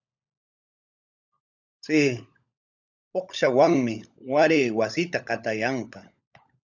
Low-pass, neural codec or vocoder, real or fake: 7.2 kHz; codec, 16 kHz, 16 kbps, FunCodec, trained on LibriTTS, 50 frames a second; fake